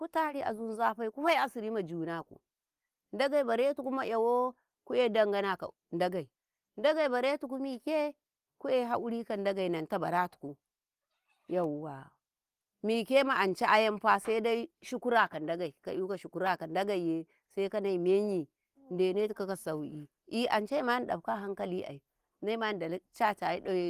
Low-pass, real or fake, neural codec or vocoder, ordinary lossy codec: 14.4 kHz; real; none; Opus, 24 kbps